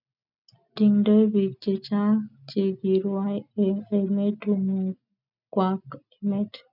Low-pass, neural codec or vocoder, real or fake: 5.4 kHz; none; real